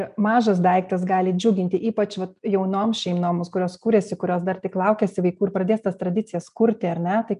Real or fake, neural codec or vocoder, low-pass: real; none; 10.8 kHz